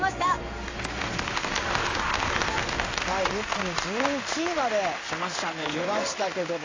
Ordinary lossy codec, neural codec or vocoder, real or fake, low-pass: AAC, 32 kbps; codec, 16 kHz in and 24 kHz out, 1 kbps, XY-Tokenizer; fake; 7.2 kHz